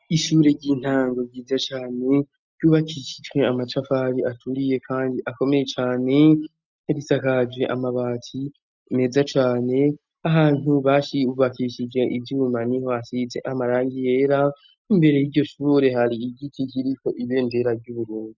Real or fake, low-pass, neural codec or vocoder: real; 7.2 kHz; none